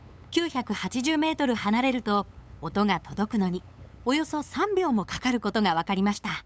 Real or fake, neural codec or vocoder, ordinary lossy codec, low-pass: fake; codec, 16 kHz, 8 kbps, FunCodec, trained on LibriTTS, 25 frames a second; none; none